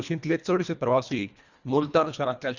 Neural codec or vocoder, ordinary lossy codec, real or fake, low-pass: codec, 24 kHz, 1.5 kbps, HILCodec; Opus, 64 kbps; fake; 7.2 kHz